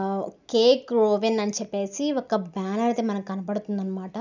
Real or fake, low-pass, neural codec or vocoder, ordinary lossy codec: real; 7.2 kHz; none; none